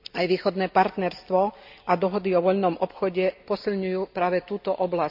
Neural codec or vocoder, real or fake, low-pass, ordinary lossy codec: none; real; 5.4 kHz; none